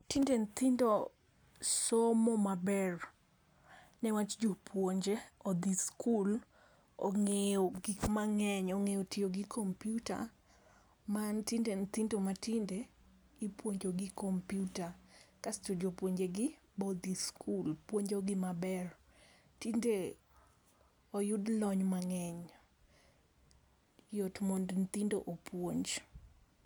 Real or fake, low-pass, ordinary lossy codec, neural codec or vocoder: real; none; none; none